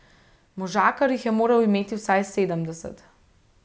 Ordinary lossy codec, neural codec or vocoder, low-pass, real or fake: none; none; none; real